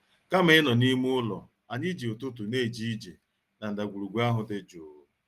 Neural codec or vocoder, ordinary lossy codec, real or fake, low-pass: none; Opus, 24 kbps; real; 14.4 kHz